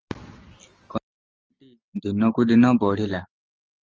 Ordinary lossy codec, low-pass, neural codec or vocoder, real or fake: Opus, 24 kbps; 7.2 kHz; none; real